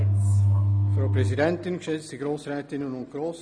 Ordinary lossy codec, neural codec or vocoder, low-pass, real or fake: none; none; 9.9 kHz; real